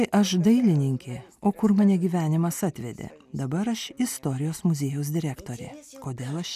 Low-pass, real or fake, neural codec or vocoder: 14.4 kHz; real; none